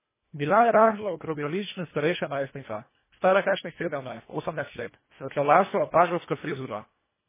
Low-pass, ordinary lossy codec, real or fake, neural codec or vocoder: 3.6 kHz; MP3, 16 kbps; fake; codec, 24 kHz, 1.5 kbps, HILCodec